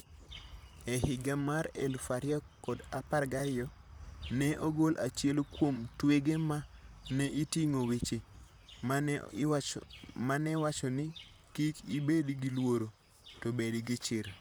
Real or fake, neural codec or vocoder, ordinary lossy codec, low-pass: fake; vocoder, 44.1 kHz, 128 mel bands, Pupu-Vocoder; none; none